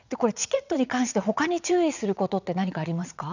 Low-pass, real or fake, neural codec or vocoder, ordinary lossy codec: 7.2 kHz; fake; vocoder, 22.05 kHz, 80 mel bands, WaveNeXt; none